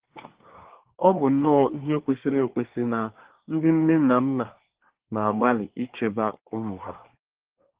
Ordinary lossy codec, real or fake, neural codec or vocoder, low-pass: Opus, 16 kbps; fake; codec, 24 kHz, 1 kbps, SNAC; 3.6 kHz